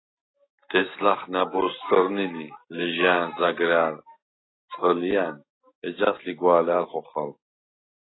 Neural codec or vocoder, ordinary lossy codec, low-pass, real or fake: none; AAC, 16 kbps; 7.2 kHz; real